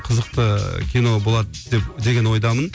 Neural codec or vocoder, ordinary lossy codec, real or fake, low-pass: none; none; real; none